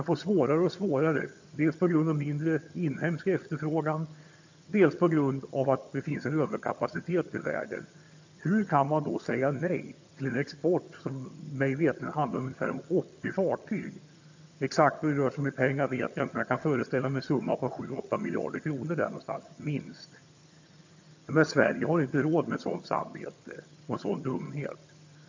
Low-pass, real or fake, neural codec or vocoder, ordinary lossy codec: 7.2 kHz; fake; vocoder, 22.05 kHz, 80 mel bands, HiFi-GAN; AAC, 48 kbps